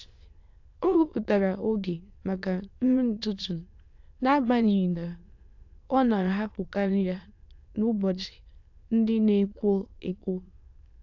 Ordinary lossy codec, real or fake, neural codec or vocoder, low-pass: AAC, 48 kbps; fake; autoencoder, 22.05 kHz, a latent of 192 numbers a frame, VITS, trained on many speakers; 7.2 kHz